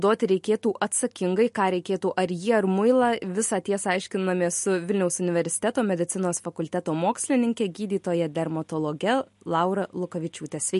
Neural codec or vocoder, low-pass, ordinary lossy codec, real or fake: none; 14.4 kHz; MP3, 48 kbps; real